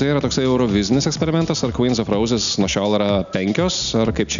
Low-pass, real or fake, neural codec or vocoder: 7.2 kHz; real; none